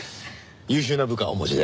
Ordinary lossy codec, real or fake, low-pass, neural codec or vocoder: none; real; none; none